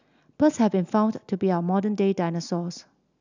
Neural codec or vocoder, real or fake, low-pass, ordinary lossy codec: none; real; 7.2 kHz; none